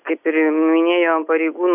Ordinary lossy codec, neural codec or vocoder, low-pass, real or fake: AAC, 24 kbps; none; 3.6 kHz; real